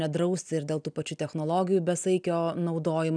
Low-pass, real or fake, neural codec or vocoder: 9.9 kHz; real; none